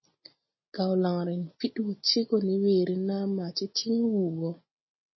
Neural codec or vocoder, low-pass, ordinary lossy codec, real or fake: none; 7.2 kHz; MP3, 24 kbps; real